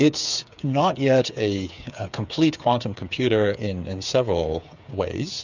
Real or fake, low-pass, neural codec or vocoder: fake; 7.2 kHz; codec, 16 kHz, 8 kbps, FreqCodec, smaller model